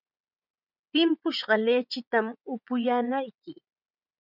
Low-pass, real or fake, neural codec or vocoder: 5.4 kHz; fake; vocoder, 24 kHz, 100 mel bands, Vocos